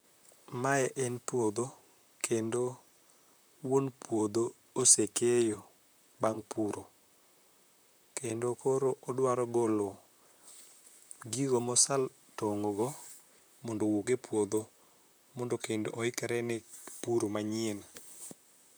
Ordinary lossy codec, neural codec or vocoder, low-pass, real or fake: none; vocoder, 44.1 kHz, 128 mel bands, Pupu-Vocoder; none; fake